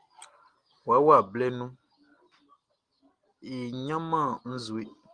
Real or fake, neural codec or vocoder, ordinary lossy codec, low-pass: real; none; Opus, 24 kbps; 9.9 kHz